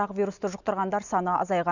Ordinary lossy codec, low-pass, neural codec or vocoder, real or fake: none; 7.2 kHz; none; real